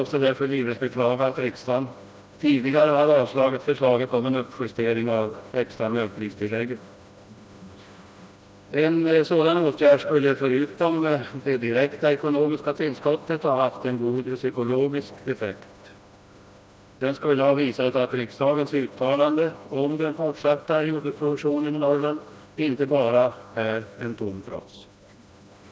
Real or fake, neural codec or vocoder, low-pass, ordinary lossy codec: fake; codec, 16 kHz, 1 kbps, FreqCodec, smaller model; none; none